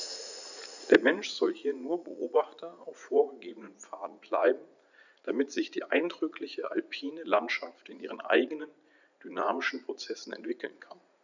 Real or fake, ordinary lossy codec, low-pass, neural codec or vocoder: fake; none; 7.2 kHz; vocoder, 44.1 kHz, 80 mel bands, Vocos